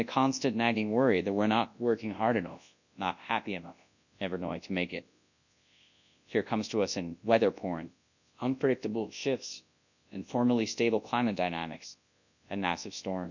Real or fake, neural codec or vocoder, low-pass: fake; codec, 24 kHz, 0.9 kbps, WavTokenizer, large speech release; 7.2 kHz